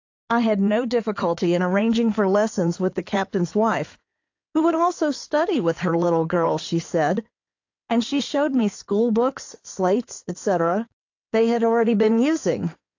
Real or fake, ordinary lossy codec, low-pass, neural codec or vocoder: fake; AAC, 48 kbps; 7.2 kHz; codec, 16 kHz in and 24 kHz out, 2.2 kbps, FireRedTTS-2 codec